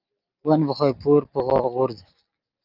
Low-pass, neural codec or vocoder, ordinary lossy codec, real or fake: 5.4 kHz; none; Opus, 24 kbps; real